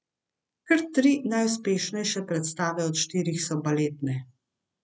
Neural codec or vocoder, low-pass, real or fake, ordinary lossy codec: none; none; real; none